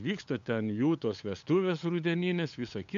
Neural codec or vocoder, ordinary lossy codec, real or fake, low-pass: codec, 16 kHz, 8 kbps, FunCodec, trained on LibriTTS, 25 frames a second; MP3, 64 kbps; fake; 7.2 kHz